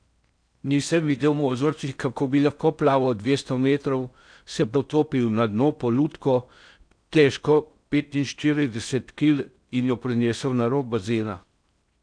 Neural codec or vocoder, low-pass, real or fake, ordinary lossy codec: codec, 16 kHz in and 24 kHz out, 0.6 kbps, FocalCodec, streaming, 4096 codes; 9.9 kHz; fake; none